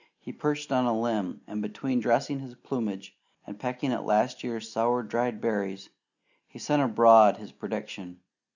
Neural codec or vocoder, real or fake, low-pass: none; real; 7.2 kHz